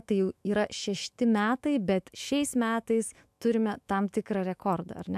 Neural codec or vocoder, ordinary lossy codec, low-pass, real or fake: autoencoder, 48 kHz, 128 numbers a frame, DAC-VAE, trained on Japanese speech; AAC, 96 kbps; 14.4 kHz; fake